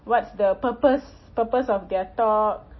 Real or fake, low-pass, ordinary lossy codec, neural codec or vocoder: real; 7.2 kHz; MP3, 24 kbps; none